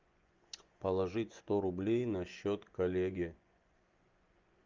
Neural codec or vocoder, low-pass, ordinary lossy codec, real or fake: vocoder, 24 kHz, 100 mel bands, Vocos; 7.2 kHz; Opus, 32 kbps; fake